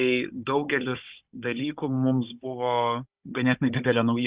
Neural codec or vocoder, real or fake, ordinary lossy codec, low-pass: codec, 16 kHz, 16 kbps, FunCodec, trained on Chinese and English, 50 frames a second; fake; Opus, 64 kbps; 3.6 kHz